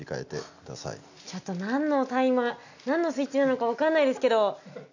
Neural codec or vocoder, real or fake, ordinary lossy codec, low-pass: none; real; none; 7.2 kHz